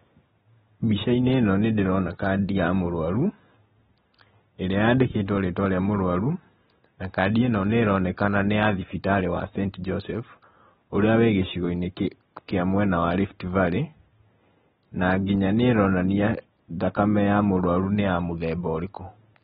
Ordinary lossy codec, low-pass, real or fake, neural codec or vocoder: AAC, 16 kbps; 10.8 kHz; real; none